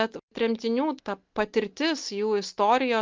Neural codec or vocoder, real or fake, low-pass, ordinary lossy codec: none; real; 7.2 kHz; Opus, 32 kbps